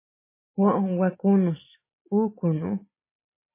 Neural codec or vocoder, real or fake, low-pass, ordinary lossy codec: none; real; 3.6 kHz; MP3, 16 kbps